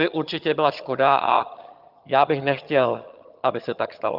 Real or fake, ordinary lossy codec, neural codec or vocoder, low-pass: fake; Opus, 32 kbps; vocoder, 22.05 kHz, 80 mel bands, HiFi-GAN; 5.4 kHz